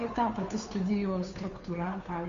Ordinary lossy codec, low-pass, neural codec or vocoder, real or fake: Opus, 64 kbps; 7.2 kHz; codec, 16 kHz, 2 kbps, FunCodec, trained on Chinese and English, 25 frames a second; fake